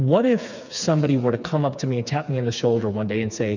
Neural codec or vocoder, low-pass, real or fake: codec, 16 kHz, 4 kbps, FreqCodec, smaller model; 7.2 kHz; fake